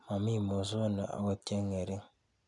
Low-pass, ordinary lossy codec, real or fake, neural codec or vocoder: 10.8 kHz; none; real; none